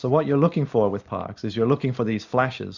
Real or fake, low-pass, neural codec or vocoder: real; 7.2 kHz; none